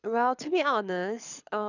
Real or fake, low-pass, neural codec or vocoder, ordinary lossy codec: fake; 7.2 kHz; codec, 24 kHz, 6 kbps, HILCodec; none